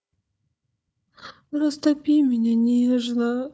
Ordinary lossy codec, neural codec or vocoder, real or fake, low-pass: none; codec, 16 kHz, 4 kbps, FunCodec, trained on Chinese and English, 50 frames a second; fake; none